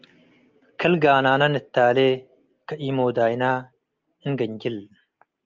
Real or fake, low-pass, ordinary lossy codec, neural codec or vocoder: real; 7.2 kHz; Opus, 32 kbps; none